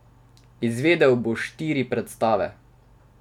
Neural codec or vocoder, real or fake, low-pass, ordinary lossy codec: none; real; 19.8 kHz; none